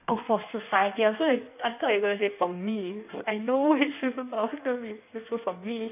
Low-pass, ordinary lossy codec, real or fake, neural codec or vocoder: 3.6 kHz; none; fake; codec, 16 kHz in and 24 kHz out, 1.1 kbps, FireRedTTS-2 codec